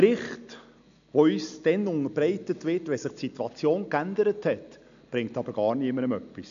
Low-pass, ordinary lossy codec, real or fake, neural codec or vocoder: 7.2 kHz; none; real; none